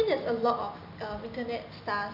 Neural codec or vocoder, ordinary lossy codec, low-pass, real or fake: none; none; 5.4 kHz; real